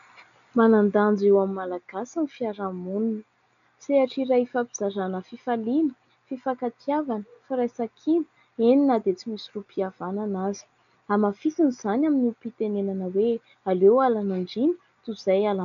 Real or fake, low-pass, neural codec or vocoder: real; 7.2 kHz; none